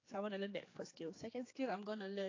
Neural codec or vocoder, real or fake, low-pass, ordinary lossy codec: codec, 16 kHz, 4 kbps, X-Codec, HuBERT features, trained on general audio; fake; 7.2 kHz; AAC, 32 kbps